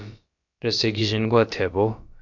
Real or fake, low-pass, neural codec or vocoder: fake; 7.2 kHz; codec, 16 kHz, about 1 kbps, DyCAST, with the encoder's durations